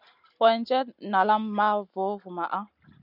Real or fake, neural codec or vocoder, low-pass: real; none; 5.4 kHz